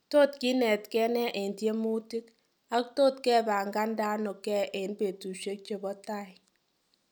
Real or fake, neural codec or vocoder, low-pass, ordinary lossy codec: real; none; none; none